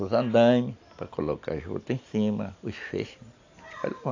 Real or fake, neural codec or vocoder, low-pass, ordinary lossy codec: real; none; 7.2 kHz; AAC, 48 kbps